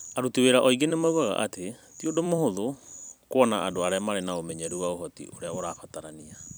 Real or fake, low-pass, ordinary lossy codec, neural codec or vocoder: fake; none; none; vocoder, 44.1 kHz, 128 mel bands every 256 samples, BigVGAN v2